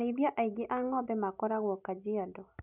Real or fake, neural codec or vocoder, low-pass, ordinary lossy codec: real; none; 3.6 kHz; none